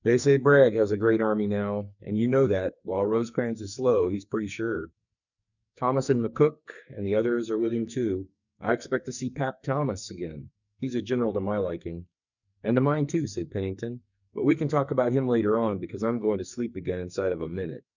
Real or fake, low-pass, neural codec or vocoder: fake; 7.2 kHz; codec, 44.1 kHz, 2.6 kbps, SNAC